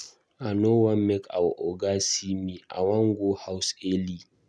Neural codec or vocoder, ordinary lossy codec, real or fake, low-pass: none; none; real; none